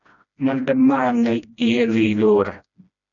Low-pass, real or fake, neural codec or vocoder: 7.2 kHz; fake; codec, 16 kHz, 1 kbps, FreqCodec, smaller model